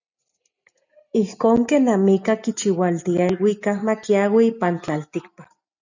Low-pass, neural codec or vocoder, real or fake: 7.2 kHz; none; real